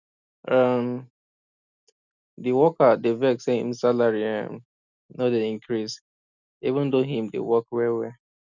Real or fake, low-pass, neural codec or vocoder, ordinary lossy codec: real; 7.2 kHz; none; none